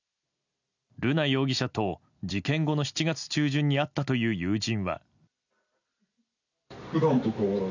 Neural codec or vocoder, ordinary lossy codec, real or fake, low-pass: none; none; real; 7.2 kHz